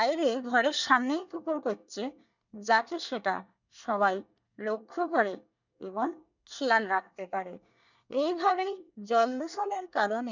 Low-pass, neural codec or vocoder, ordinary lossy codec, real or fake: 7.2 kHz; codec, 24 kHz, 1 kbps, SNAC; none; fake